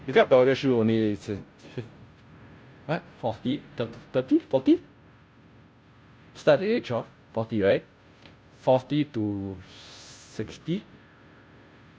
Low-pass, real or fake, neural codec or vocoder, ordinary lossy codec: none; fake; codec, 16 kHz, 0.5 kbps, FunCodec, trained on Chinese and English, 25 frames a second; none